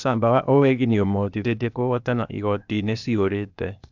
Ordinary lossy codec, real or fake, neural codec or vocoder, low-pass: none; fake; codec, 16 kHz, 0.8 kbps, ZipCodec; 7.2 kHz